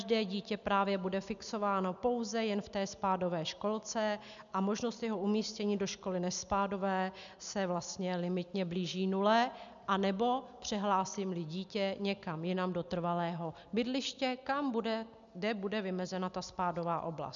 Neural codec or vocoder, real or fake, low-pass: none; real; 7.2 kHz